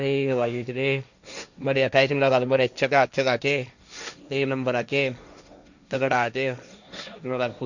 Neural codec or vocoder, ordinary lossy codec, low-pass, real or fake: codec, 16 kHz, 1.1 kbps, Voila-Tokenizer; none; 7.2 kHz; fake